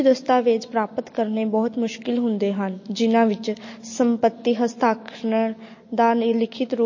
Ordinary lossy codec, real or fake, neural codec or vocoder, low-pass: MP3, 32 kbps; real; none; 7.2 kHz